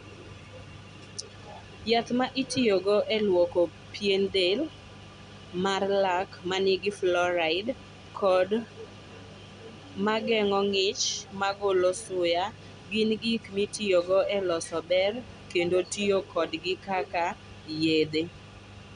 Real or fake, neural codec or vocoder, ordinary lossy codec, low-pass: real; none; none; 9.9 kHz